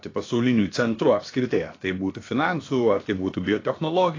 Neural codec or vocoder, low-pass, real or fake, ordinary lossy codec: codec, 16 kHz, about 1 kbps, DyCAST, with the encoder's durations; 7.2 kHz; fake; AAC, 32 kbps